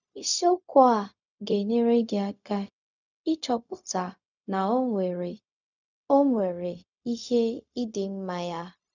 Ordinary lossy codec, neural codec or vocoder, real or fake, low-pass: none; codec, 16 kHz, 0.4 kbps, LongCat-Audio-Codec; fake; 7.2 kHz